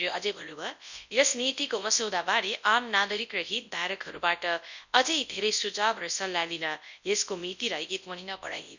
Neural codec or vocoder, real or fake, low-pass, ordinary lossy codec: codec, 24 kHz, 0.9 kbps, WavTokenizer, large speech release; fake; 7.2 kHz; none